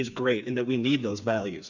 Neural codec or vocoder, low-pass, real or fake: codec, 16 kHz, 4 kbps, FreqCodec, smaller model; 7.2 kHz; fake